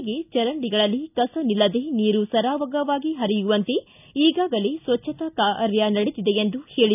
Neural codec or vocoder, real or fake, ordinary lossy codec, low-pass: none; real; none; 3.6 kHz